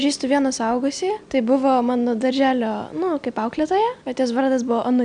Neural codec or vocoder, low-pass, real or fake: none; 9.9 kHz; real